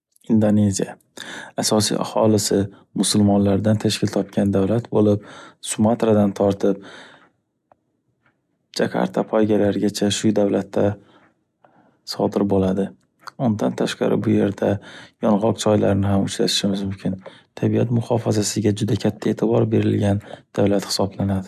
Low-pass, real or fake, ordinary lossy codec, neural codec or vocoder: 14.4 kHz; real; none; none